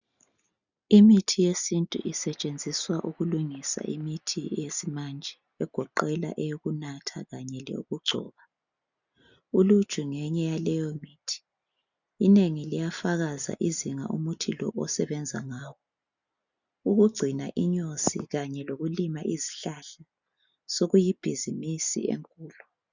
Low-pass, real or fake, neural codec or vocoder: 7.2 kHz; real; none